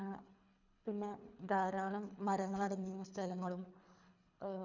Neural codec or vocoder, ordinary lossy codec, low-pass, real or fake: codec, 24 kHz, 3 kbps, HILCodec; none; 7.2 kHz; fake